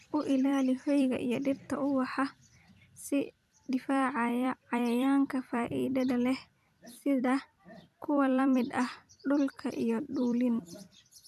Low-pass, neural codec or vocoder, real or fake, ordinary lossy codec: 14.4 kHz; vocoder, 44.1 kHz, 128 mel bands every 256 samples, BigVGAN v2; fake; none